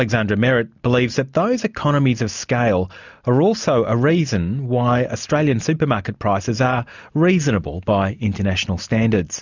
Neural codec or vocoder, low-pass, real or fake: none; 7.2 kHz; real